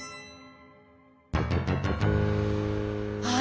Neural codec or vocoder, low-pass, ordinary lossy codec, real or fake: none; none; none; real